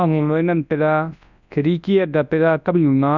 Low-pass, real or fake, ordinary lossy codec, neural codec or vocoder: 7.2 kHz; fake; none; codec, 24 kHz, 0.9 kbps, WavTokenizer, large speech release